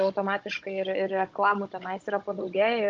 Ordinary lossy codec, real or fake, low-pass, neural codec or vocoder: Opus, 24 kbps; fake; 7.2 kHz; codec, 16 kHz, 16 kbps, FunCodec, trained on Chinese and English, 50 frames a second